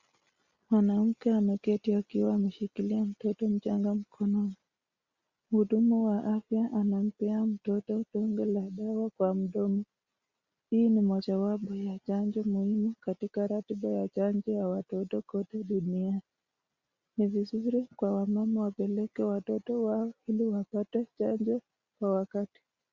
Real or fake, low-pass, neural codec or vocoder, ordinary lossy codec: real; 7.2 kHz; none; Opus, 64 kbps